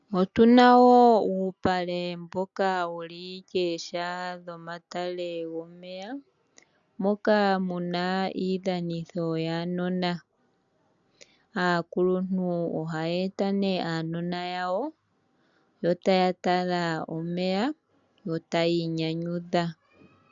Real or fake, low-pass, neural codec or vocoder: real; 7.2 kHz; none